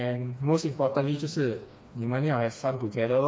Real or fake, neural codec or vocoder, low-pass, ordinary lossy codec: fake; codec, 16 kHz, 2 kbps, FreqCodec, smaller model; none; none